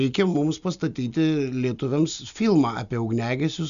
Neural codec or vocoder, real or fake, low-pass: none; real; 7.2 kHz